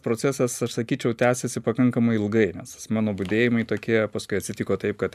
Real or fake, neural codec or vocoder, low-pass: real; none; 14.4 kHz